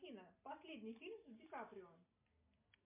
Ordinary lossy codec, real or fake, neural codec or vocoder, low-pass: MP3, 32 kbps; real; none; 3.6 kHz